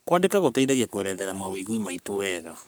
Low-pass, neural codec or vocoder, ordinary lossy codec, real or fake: none; codec, 44.1 kHz, 3.4 kbps, Pupu-Codec; none; fake